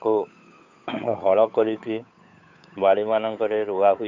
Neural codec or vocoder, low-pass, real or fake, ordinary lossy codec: codec, 16 kHz, 8 kbps, FunCodec, trained on Chinese and English, 25 frames a second; 7.2 kHz; fake; AAC, 48 kbps